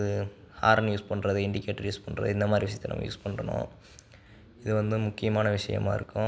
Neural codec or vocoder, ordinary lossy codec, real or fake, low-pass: none; none; real; none